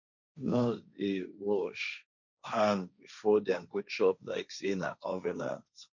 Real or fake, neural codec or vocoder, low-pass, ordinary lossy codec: fake; codec, 16 kHz, 1.1 kbps, Voila-Tokenizer; 7.2 kHz; none